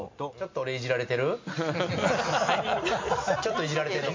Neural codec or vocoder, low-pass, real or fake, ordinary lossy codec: none; 7.2 kHz; real; MP3, 48 kbps